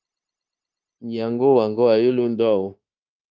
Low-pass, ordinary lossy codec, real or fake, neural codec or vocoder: 7.2 kHz; Opus, 24 kbps; fake; codec, 16 kHz, 0.9 kbps, LongCat-Audio-Codec